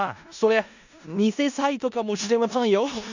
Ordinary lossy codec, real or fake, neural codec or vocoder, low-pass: none; fake; codec, 16 kHz in and 24 kHz out, 0.4 kbps, LongCat-Audio-Codec, four codebook decoder; 7.2 kHz